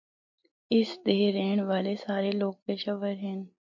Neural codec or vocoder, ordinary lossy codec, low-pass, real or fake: none; MP3, 48 kbps; 7.2 kHz; real